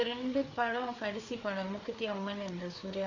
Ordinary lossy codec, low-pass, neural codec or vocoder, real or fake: MP3, 64 kbps; 7.2 kHz; codec, 16 kHz, 4 kbps, FreqCodec, larger model; fake